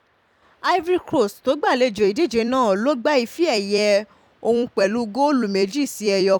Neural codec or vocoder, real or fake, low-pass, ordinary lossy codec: vocoder, 44.1 kHz, 128 mel bands every 256 samples, BigVGAN v2; fake; 19.8 kHz; none